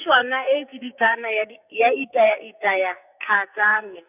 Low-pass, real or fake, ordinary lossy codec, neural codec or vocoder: 3.6 kHz; fake; none; codec, 44.1 kHz, 2.6 kbps, SNAC